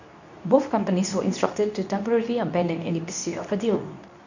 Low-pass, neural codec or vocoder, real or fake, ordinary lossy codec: 7.2 kHz; codec, 24 kHz, 0.9 kbps, WavTokenizer, medium speech release version 2; fake; none